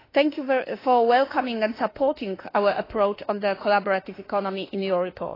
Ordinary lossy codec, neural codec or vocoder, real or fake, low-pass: AAC, 24 kbps; autoencoder, 48 kHz, 32 numbers a frame, DAC-VAE, trained on Japanese speech; fake; 5.4 kHz